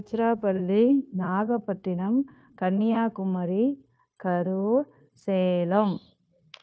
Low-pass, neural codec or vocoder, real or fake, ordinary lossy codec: none; codec, 16 kHz, 0.9 kbps, LongCat-Audio-Codec; fake; none